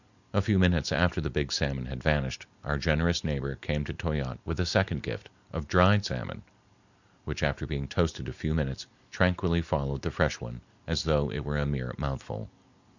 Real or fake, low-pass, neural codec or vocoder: real; 7.2 kHz; none